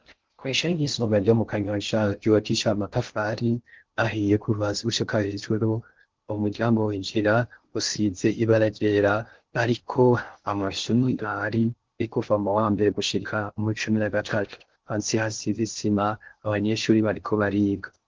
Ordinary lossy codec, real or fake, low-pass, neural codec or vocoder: Opus, 16 kbps; fake; 7.2 kHz; codec, 16 kHz in and 24 kHz out, 0.6 kbps, FocalCodec, streaming, 4096 codes